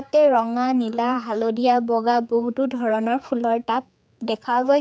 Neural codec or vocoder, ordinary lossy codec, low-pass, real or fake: codec, 16 kHz, 4 kbps, X-Codec, HuBERT features, trained on general audio; none; none; fake